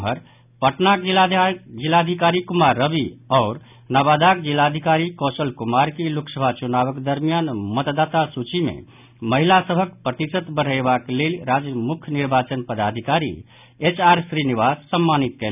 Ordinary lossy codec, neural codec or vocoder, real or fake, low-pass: none; none; real; 3.6 kHz